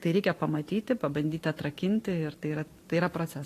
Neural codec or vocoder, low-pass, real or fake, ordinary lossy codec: vocoder, 44.1 kHz, 128 mel bands every 512 samples, BigVGAN v2; 14.4 kHz; fake; AAC, 48 kbps